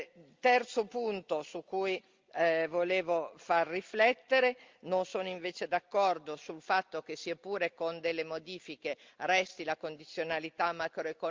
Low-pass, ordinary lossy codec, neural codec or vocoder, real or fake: 7.2 kHz; Opus, 32 kbps; none; real